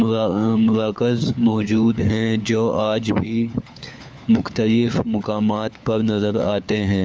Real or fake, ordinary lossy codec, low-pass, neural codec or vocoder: fake; none; none; codec, 16 kHz, 4 kbps, FunCodec, trained on LibriTTS, 50 frames a second